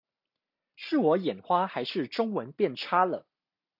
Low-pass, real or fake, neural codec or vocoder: 5.4 kHz; real; none